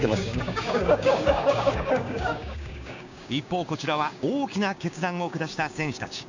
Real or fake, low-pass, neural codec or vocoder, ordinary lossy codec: fake; 7.2 kHz; codec, 16 kHz, 6 kbps, DAC; none